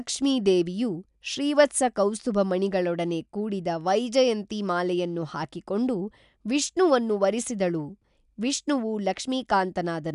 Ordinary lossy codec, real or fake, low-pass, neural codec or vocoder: none; real; 10.8 kHz; none